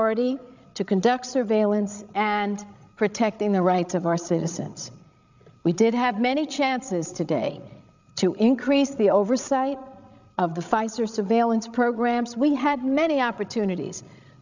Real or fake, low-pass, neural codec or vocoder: fake; 7.2 kHz; codec, 16 kHz, 16 kbps, FreqCodec, larger model